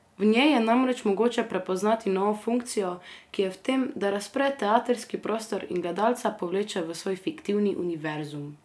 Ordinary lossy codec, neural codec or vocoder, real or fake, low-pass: none; none; real; none